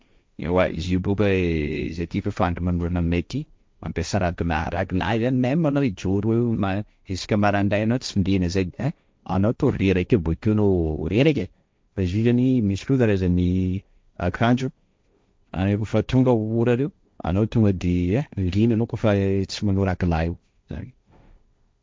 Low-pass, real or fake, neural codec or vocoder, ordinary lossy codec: none; fake; codec, 16 kHz, 1.1 kbps, Voila-Tokenizer; none